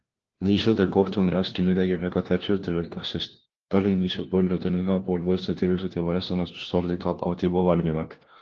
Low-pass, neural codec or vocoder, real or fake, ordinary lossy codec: 7.2 kHz; codec, 16 kHz, 1 kbps, FunCodec, trained on LibriTTS, 50 frames a second; fake; Opus, 16 kbps